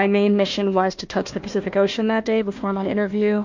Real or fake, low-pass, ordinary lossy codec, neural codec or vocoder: fake; 7.2 kHz; MP3, 48 kbps; codec, 16 kHz, 1 kbps, FunCodec, trained on Chinese and English, 50 frames a second